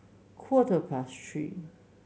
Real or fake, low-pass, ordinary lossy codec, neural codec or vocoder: real; none; none; none